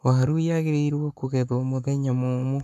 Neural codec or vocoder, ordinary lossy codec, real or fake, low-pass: codec, 44.1 kHz, 7.8 kbps, Pupu-Codec; none; fake; 14.4 kHz